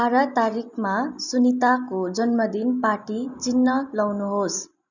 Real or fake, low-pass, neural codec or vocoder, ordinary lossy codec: real; 7.2 kHz; none; none